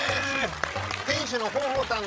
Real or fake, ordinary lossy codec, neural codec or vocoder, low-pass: fake; none; codec, 16 kHz, 16 kbps, FreqCodec, larger model; none